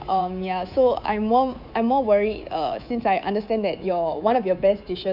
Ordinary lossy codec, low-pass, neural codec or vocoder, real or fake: none; 5.4 kHz; codec, 24 kHz, 3.1 kbps, DualCodec; fake